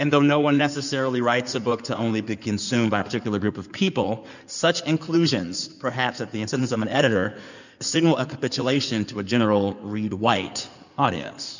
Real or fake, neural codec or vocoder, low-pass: fake; codec, 16 kHz in and 24 kHz out, 2.2 kbps, FireRedTTS-2 codec; 7.2 kHz